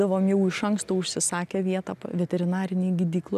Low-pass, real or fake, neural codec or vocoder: 14.4 kHz; real; none